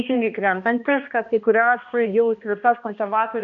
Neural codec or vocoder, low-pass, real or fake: codec, 16 kHz, 1 kbps, X-Codec, HuBERT features, trained on balanced general audio; 7.2 kHz; fake